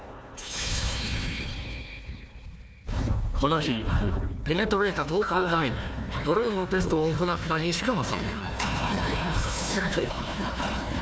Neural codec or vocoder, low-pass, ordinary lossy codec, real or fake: codec, 16 kHz, 1 kbps, FunCodec, trained on Chinese and English, 50 frames a second; none; none; fake